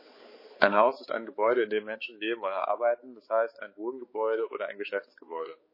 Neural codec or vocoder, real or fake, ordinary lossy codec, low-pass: codec, 16 kHz, 4 kbps, X-Codec, HuBERT features, trained on balanced general audio; fake; MP3, 24 kbps; 5.4 kHz